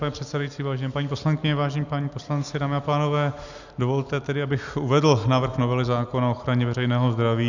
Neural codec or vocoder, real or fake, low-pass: none; real; 7.2 kHz